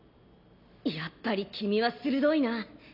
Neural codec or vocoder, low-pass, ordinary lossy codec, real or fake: none; 5.4 kHz; none; real